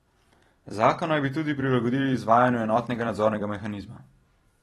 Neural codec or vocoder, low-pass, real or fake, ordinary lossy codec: vocoder, 44.1 kHz, 128 mel bands every 256 samples, BigVGAN v2; 19.8 kHz; fake; AAC, 32 kbps